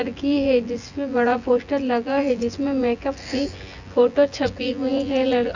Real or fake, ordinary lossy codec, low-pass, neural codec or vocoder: fake; Opus, 64 kbps; 7.2 kHz; vocoder, 24 kHz, 100 mel bands, Vocos